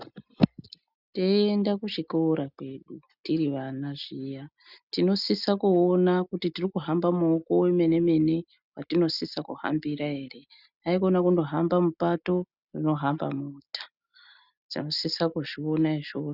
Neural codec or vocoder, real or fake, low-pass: none; real; 5.4 kHz